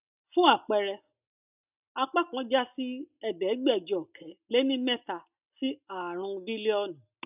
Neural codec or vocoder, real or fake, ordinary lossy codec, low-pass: none; real; none; 3.6 kHz